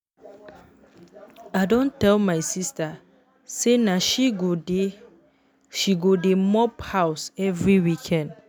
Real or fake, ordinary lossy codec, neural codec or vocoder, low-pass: real; none; none; none